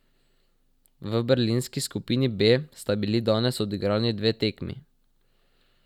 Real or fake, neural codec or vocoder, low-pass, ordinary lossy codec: real; none; 19.8 kHz; none